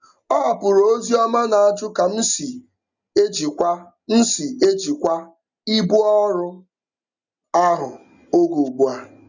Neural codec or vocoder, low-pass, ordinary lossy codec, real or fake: none; 7.2 kHz; none; real